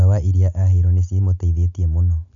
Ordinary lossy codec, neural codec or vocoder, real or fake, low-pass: none; none; real; 7.2 kHz